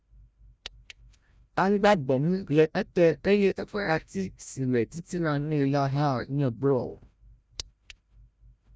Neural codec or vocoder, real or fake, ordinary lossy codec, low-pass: codec, 16 kHz, 0.5 kbps, FreqCodec, larger model; fake; none; none